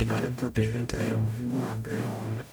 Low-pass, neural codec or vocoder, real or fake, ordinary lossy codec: none; codec, 44.1 kHz, 0.9 kbps, DAC; fake; none